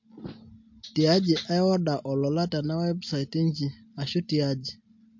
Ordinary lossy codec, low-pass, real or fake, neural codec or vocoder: MP3, 48 kbps; 7.2 kHz; real; none